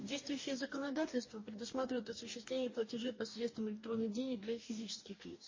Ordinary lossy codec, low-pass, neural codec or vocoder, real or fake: MP3, 32 kbps; 7.2 kHz; codec, 44.1 kHz, 2.6 kbps, DAC; fake